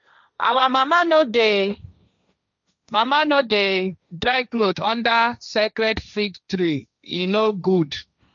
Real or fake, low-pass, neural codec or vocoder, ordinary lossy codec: fake; 7.2 kHz; codec, 16 kHz, 1.1 kbps, Voila-Tokenizer; none